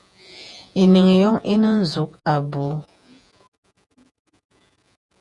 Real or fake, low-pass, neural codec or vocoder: fake; 10.8 kHz; vocoder, 48 kHz, 128 mel bands, Vocos